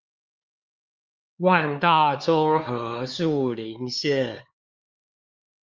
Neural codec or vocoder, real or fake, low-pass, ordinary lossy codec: codec, 16 kHz, 4 kbps, X-Codec, HuBERT features, trained on LibriSpeech; fake; 7.2 kHz; Opus, 32 kbps